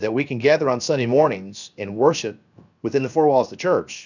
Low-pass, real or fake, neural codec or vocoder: 7.2 kHz; fake; codec, 16 kHz, 0.7 kbps, FocalCodec